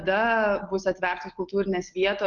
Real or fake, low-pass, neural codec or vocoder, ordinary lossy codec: real; 7.2 kHz; none; Opus, 32 kbps